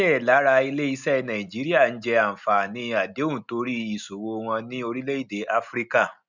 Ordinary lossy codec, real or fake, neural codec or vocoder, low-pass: none; real; none; 7.2 kHz